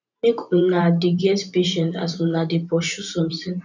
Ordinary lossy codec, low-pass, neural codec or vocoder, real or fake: none; 7.2 kHz; vocoder, 44.1 kHz, 128 mel bands every 512 samples, BigVGAN v2; fake